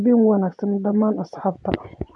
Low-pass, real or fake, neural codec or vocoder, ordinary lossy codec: 10.8 kHz; fake; vocoder, 24 kHz, 100 mel bands, Vocos; none